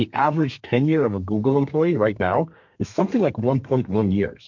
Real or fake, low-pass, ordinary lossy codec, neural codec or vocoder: fake; 7.2 kHz; MP3, 48 kbps; codec, 32 kHz, 1.9 kbps, SNAC